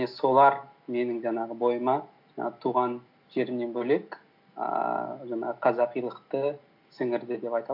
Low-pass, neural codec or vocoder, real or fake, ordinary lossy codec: 5.4 kHz; none; real; AAC, 48 kbps